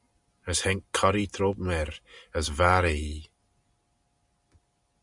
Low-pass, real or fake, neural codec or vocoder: 10.8 kHz; real; none